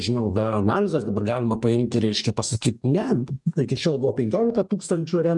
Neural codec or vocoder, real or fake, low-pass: codec, 44.1 kHz, 2.6 kbps, DAC; fake; 10.8 kHz